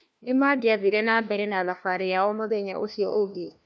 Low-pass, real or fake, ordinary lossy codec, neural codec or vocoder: none; fake; none; codec, 16 kHz, 1 kbps, FunCodec, trained on LibriTTS, 50 frames a second